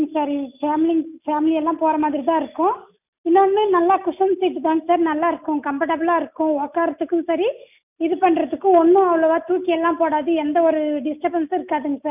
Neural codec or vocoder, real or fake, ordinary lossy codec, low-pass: none; real; none; 3.6 kHz